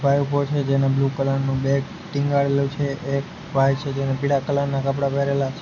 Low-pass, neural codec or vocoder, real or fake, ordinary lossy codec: 7.2 kHz; none; real; MP3, 48 kbps